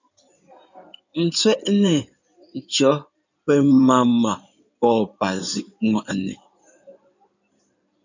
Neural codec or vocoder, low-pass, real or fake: codec, 16 kHz in and 24 kHz out, 2.2 kbps, FireRedTTS-2 codec; 7.2 kHz; fake